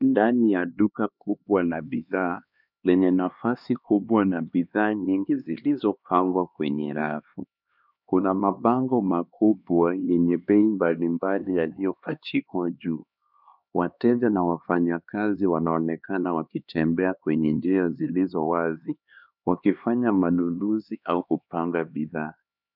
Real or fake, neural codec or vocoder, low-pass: fake; codec, 16 kHz, 2 kbps, X-Codec, HuBERT features, trained on LibriSpeech; 5.4 kHz